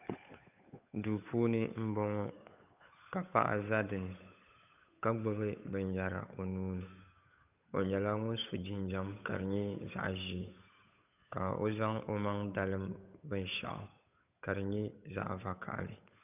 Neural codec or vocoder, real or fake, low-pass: codec, 16 kHz, 8 kbps, FunCodec, trained on Chinese and English, 25 frames a second; fake; 3.6 kHz